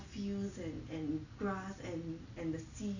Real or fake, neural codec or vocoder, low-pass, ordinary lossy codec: real; none; 7.2 kHz; none